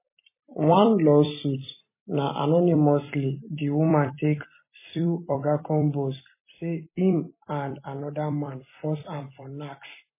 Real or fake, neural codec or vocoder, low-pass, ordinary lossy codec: fake; vocoder, 44.1 kHz, 128 mel bands every 256 samples, BigVGAN v2; 3.6 kHz; MP3, 16 kbps